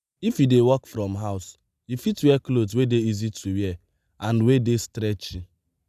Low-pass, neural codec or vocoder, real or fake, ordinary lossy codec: 14.4 kHz; none; real; none